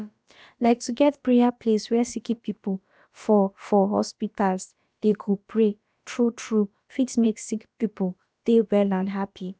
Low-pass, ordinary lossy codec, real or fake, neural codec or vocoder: none; none; fake; codec, 16 kHz, about 1 kbps, DyCAST, with the encoder's durations